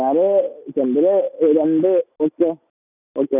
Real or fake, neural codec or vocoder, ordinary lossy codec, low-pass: real; none; none; 3.6 kHz